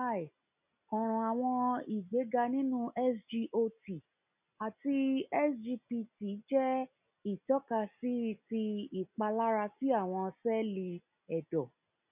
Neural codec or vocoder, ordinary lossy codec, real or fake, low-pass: none; none; real; 3.6 kHz